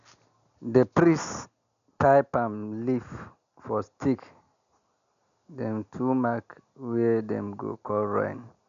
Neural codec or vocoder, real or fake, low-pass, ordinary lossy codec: none; real; 7.2 kHz; none